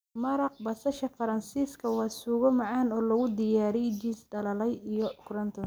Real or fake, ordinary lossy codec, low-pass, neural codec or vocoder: real; none; none; none